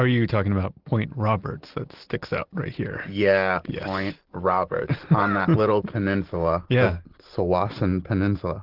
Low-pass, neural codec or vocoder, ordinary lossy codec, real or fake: 5.4 kHz; none; Opus, 16 kbps; real